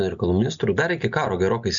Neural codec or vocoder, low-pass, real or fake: none; 7.2 kHz; real